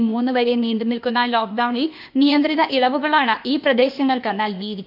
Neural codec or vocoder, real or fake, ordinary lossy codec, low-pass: codec, 16 kHz, 0.8 kbps, ZipCodec; fake; MP3, 48 kbps; 5.4 kHz